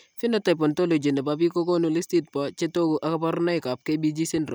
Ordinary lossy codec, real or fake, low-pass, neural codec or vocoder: none; real; none; none